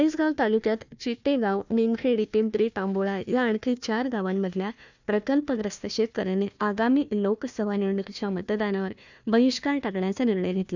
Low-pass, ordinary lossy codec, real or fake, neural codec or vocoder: 7.2 kHz; none; fake; codec, 16 kHz, 1 kbps, FunCodec, trained on Chinese and English, 50 frames a second